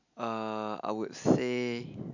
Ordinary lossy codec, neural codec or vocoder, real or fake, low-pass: none; none; real; 7.2 kHz